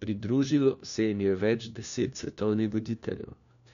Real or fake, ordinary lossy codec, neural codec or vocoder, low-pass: fake; none; codec, 16 kHz, 1 kbps, FunCodec, trained on LibriTTS, 50 frames a second; 7.2 kHz